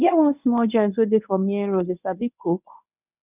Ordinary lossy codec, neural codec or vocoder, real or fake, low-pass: none; codec, 24 kHz, 0.9 kbps, WavTokenizer, medium speech release version 1; fake; 3.6 kHz